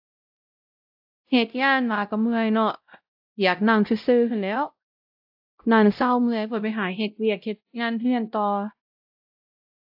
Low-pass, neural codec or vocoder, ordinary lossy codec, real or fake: 5.4 kHz; codec, 16 kHz, 0.5 kbps, X-Codec, WavLM features, trained on Multilingual LibriSpeech; AAC, 48 kbps; fake